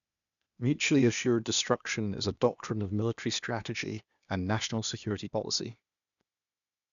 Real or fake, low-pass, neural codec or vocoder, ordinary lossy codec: fake; 7.2 kHz; codec, 16 kHz, 0.8 kbps, ZipCodec; none